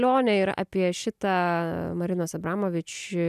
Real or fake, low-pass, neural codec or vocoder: real; 14.4 kHz; none